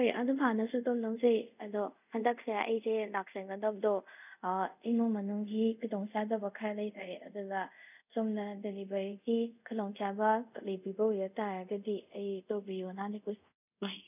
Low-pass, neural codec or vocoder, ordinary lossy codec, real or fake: 3.6 kHz; codec, 24 kHz, 0.5 kbps, DualCodec; none; fake